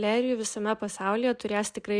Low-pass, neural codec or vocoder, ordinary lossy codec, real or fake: 9.9 kHz; none; Opus, 64 kbps; real